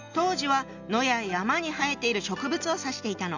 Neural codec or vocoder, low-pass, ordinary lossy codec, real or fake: none; 7.2 kHz; none; real